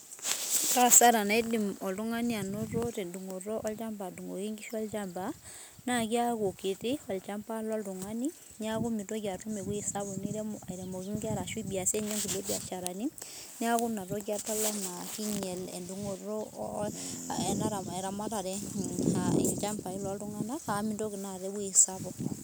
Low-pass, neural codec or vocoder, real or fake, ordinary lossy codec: none; none; real; none